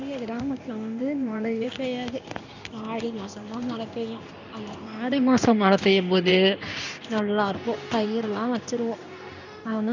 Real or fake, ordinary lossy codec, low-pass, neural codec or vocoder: fake; none; 7.2 kHz; codec, 16 kHz in and 24 kHz out, 1 kbps, XY-Tokenizer